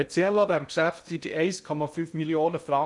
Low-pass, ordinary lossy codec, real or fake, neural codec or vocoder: 10.8 kHz; none; fake; codec, 16 kHz in and 24 kHz out, 0.6 kbps, FocalCodec, streaming, 2048 codes